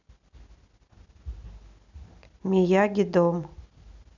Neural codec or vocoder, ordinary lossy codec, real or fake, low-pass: none; none; real; 7.2 kHz